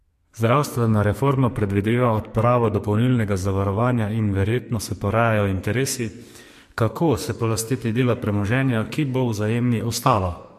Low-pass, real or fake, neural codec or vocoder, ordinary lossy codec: 14.4 kHz; fake; codec, 44.1 kHz, 2.6 kbps, SNAC; MP3, 64 kbps